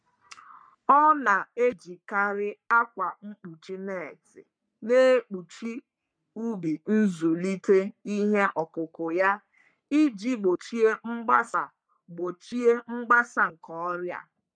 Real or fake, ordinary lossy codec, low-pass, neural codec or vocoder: fake; MP3, 96 kbps; 9.9 kHz; codec, 44.1 kHz, 3.4 kbps, Pupu-Codec